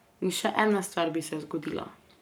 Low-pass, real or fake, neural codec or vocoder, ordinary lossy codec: none; fake; codec, 44.1 kHz, 7.8 kbps, Pupu-Codec; none